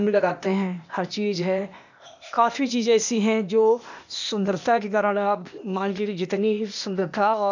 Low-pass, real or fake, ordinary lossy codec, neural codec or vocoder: 7.2 kHz; fake; none; codec, 16 kHz, 0.8 kbps, ZipCodec